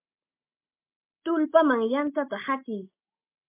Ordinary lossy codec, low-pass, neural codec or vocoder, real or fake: MP3, 32 kbps; 3.6 kHz; none; real